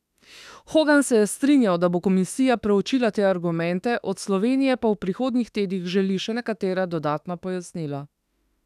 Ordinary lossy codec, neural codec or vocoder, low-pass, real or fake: none; autoencoder, 48 kHz, 32 numbers a frame, DAC-VAE, trained on Japanese speech; 14.4 kHz; fake